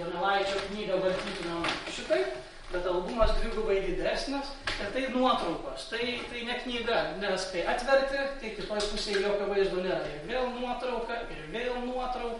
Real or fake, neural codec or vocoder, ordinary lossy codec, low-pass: real; none; MP3, 48 kbps; 19.8 kHz